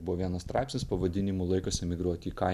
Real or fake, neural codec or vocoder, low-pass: real; none; 14.4 kHz